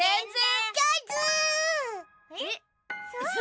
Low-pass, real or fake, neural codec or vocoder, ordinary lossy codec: none; real; none; none